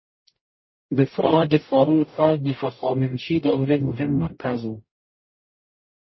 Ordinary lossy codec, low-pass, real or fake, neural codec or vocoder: MP3, 24 kbps; 7.2 kHz; fake; codec, 44.1 kHz, 0.9 kbps, DAC